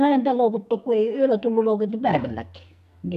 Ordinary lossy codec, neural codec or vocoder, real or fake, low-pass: none; codec, 44.1 kHz, 2.6 kbps, SNAC; fake; 14.4 kHz